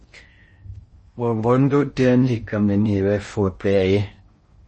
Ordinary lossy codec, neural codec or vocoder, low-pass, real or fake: MP3, 32 kbps; codec, 16 kHz in and 24 kHz out, 0.6 kbps, FocalCodec, streaming, 4096 codes; 10.8 kHz; fake